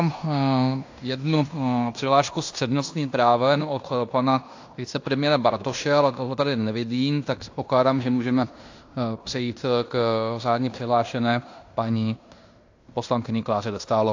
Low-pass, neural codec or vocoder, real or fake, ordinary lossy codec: 7.2 kHz; codec, 16 kHz in and 24 kHz out, 0.9 kbps, LongCat-Audio-Codec, fine tuned four codebook decoder; fake; AAC, 48 kbps